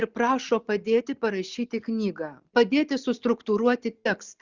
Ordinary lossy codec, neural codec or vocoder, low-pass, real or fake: Opus, 64 kbps; none; 7.2 kHz; real